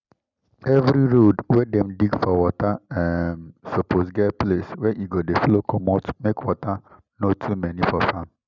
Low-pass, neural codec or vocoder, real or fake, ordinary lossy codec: 7.2 kHz; none; real; none